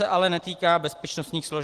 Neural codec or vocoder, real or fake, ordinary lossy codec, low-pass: none; real; Opus, 16 kbps; 14.4 kHz